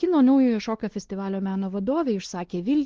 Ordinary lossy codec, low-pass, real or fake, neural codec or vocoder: Opus, 16 kbps; 7.2 kHz; fake; codec, 16 kHz, 2 kbps, X-Codec, WavLM features, trained on Multilingual LibriSpeech